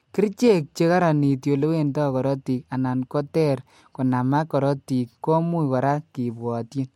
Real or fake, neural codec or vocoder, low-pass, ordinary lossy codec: real; none; 19.8 kHz; MP3, 64 kbps